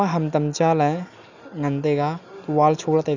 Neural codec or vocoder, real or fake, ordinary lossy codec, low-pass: none; real; none; 7.2 kHz